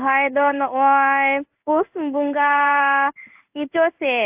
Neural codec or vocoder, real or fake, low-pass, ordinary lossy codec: codec, 16 kHz in and 24 kHz out, 1 kbps, XY-Tokenizer; fake; 3.6 kHz; AAC, 32 kbps